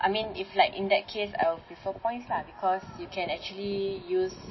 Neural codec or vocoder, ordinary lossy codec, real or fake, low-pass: none; MP3, 24 kbps; real; 7.2 kHz